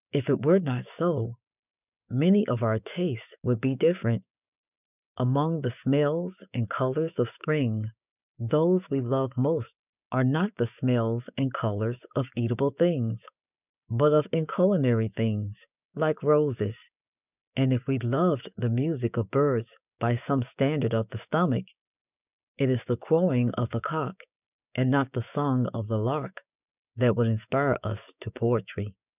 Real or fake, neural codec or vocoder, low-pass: fake; codec, 16 kHz, 6 kbps, DAC; 3.6 kHz